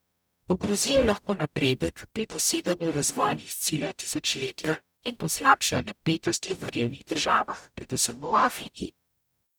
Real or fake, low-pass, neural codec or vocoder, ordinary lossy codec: fake; none; codec, 44.1 kHz, 0.9 kbps, DAC; none